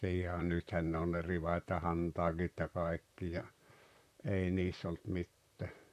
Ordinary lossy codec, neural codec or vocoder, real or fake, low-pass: none; vocoder, 44.1 kHz, 128 mel bands, Pupu-Vocoder; fake; 14.4 kHz